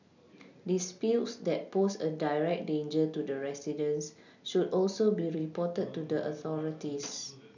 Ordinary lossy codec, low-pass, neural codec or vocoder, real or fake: none; 7.2 kHz; none; real